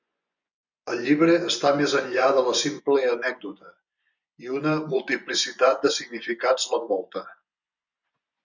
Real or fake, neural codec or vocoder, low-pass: fake; vocoder, 24 kHz, 100 mel bands, Vocos; 7.2 kHz